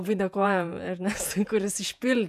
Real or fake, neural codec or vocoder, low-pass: fake; vocoder, 48 kHz, 128 mel bands, Vocos; 14.4 kHz